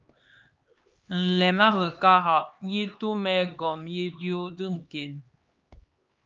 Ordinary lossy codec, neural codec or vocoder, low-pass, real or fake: Opus, 24 kbps; codec, 16 kHz, 2 kbps, X-Codec, HuBERT features, trained on LibriSpeech; 7.2 kHz; fake